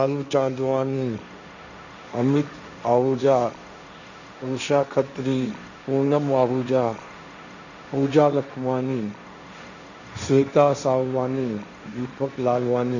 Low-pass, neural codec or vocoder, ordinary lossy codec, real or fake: 7.2 kHz; codec, 16 kHz, 1.1 kbps, Voila-Tokenizer; none; fake